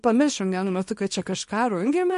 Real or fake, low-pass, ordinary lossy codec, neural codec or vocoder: fake; 10.8 kHz; MP3, 48 kbps; codec, 24 kHz, 0.9 kbps, WavTokenizer, small release